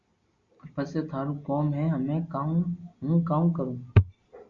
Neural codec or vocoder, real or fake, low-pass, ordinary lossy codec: none; real; 7.2 kHz; Opus, 64 kbps